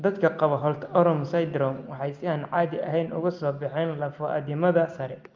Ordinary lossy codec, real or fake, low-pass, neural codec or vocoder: Opus, 32 kbps; real; 7.2 kHz; none